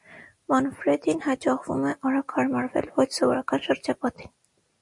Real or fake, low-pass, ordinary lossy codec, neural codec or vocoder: real; 10.8 kHz; MP3, 48 kbps; none